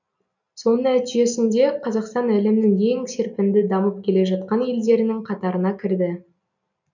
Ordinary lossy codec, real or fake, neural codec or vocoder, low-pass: none; real; none; 7.2 kHz